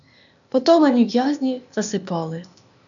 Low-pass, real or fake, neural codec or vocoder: 7.2 kHz; fake; codec, 16 kHz, 0.8 kbps, ZipCodec